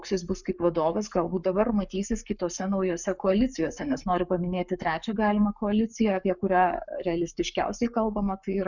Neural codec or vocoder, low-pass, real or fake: codec, 44.1 kHz, 7.8 kbps, Pupu-Codec; 7.2 kHz; fake